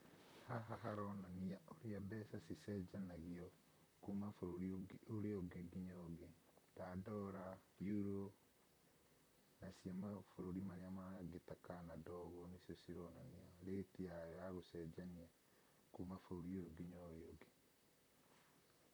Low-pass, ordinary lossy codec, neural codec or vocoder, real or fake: none; none; vocoder, 44.1 kHz, 128 mel bands, Pupu-Vocoder; fake